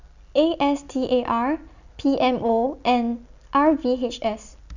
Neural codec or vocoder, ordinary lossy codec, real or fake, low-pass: none; none; real; 7.2 kHz